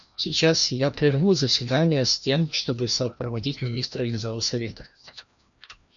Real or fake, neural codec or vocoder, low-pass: fake; codec, 16 kHz, 1 kbps, FreqCodec, larger model; 7.2 kHz